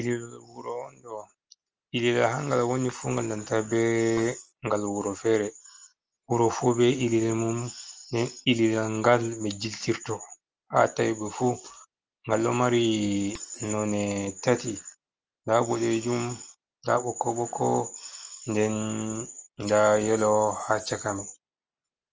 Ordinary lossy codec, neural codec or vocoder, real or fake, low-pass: Opus, 24 kbps; none; real; 7.2 kHz